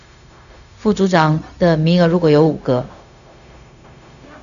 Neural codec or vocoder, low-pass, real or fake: codec, 16 kHz, 0.4 kbps, LongCat-Audio-Codec; 7.2 kHz; fake